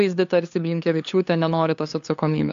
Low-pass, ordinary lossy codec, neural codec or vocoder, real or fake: 7.2 kHz; AAC, 64 kbps; codec, 16 kHz, 2 kbps, FunCodec, trained on LibriTTS, 25 frames a second; fake